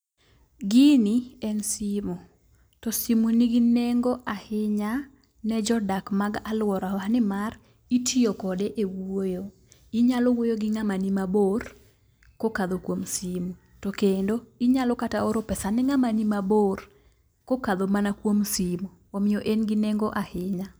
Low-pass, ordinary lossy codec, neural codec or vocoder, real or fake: none; none; none; real